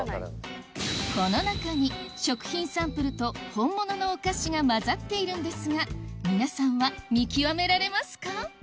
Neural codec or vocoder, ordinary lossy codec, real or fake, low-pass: none; none; real; none